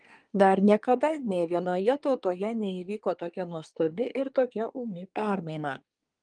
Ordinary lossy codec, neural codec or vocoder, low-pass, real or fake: Opus, 32 kbps; codec, 24 kHz, 1 kbps, SNAC; 9.9 kHz; fake